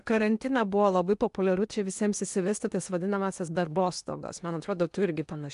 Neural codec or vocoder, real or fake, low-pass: codec, 16 kHz in and 24 kHz out, 0.8 kbps, FocalCodec, streaming, 65536 codes; fake; 10.8 kHz